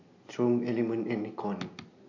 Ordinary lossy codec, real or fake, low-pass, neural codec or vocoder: AAC, 48 kbps; real; 7.2 kHz; none